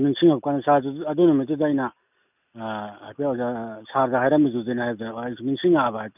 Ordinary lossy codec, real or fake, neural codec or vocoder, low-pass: none; real; none; 3.6 kHz